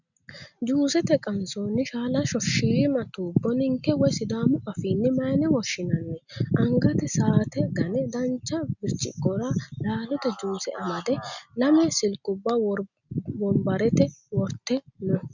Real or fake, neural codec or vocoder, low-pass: real; none; 7.2 kHz